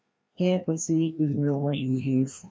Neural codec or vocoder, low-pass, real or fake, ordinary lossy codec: codec, 16 kHz, 1 kbps, FreqCodec, larger model; none; fake; none